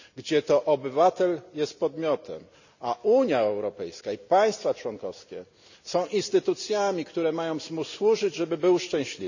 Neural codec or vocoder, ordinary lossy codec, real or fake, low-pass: none; none; real; 7.2 kHz